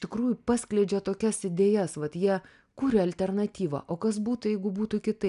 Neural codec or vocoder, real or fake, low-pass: none; real; 10.8 kHz